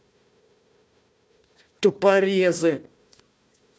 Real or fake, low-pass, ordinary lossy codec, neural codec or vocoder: fake; none; none; codec, 16 kHz, 1 kbps, FunCodec, trained on Chinese and English, 50 frames a second